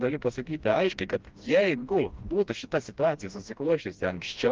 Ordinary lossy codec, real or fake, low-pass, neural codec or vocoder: Opus, 24 kbps; fake; 7.2 kHz; codec, 16 kHz, 1 kbps, FreqCodec, smaller model